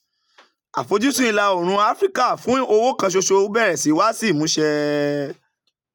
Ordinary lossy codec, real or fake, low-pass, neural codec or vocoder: none; real; 19.8 kHz; none